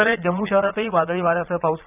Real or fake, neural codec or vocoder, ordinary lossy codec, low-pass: fake; vocoder, 22.05 kHz, 80 mel bands, Vocos; none; 3.6 kHz